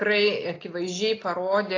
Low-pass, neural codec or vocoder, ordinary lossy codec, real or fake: 7.2 kHz; none; AAC, 32 kbps; real